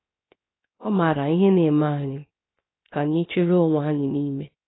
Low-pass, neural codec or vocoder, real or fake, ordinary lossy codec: 7.2 kHz; codec, 16 kHz, 0.7 kbps, FocalCodec; fake; AAC, 16 kbps